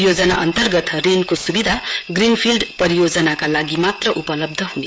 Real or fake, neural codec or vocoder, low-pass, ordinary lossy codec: fake; codec, 16 kHz, 16 kbps, FreqCodec, smaller model; none; none